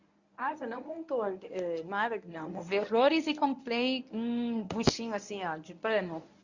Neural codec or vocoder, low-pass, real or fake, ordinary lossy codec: codec, 24 kHz, 0.9 kbps, WavTokenizer, medium speech release version 1; 7.2 kHz; fake; Opus, 64 kbps